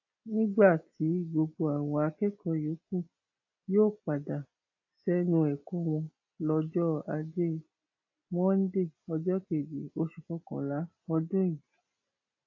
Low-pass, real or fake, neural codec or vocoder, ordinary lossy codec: 7.2 kHz; real; none; AAC, 48 kbps